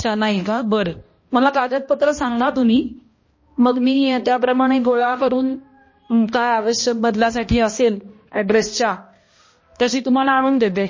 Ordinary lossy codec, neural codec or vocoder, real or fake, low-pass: MP3, 32 kbps; codec, 16 kHz, 1 kbps, X-Codec, HuBERT features, trained on balanced general audio; fake; 7.2 kHz